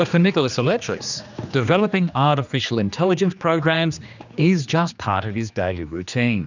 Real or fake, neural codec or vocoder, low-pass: fake; codec, 16 kHz, 2 kbps, X-Codec, HuBERT features, trained on general audio; 7.2 kHz